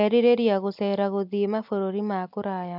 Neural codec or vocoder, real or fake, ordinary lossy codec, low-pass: none; real; MP3, 48 kbps; 5.4 kHz